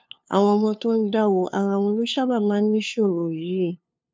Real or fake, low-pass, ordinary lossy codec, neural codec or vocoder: fake; none; none; codec, 16 kHz, 2 kbps, FunCodec, trained on LibriTTS, 25 frames a second